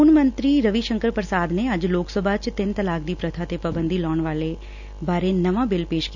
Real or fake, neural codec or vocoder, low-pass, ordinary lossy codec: real; none; 7.2 kHz; none